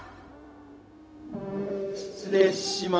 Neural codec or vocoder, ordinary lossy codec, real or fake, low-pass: codec, 16 kHz, 0.4 kbps, LongCat-Audio-Codec; none; fake; none